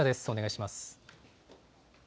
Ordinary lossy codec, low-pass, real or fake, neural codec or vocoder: none; none; real; none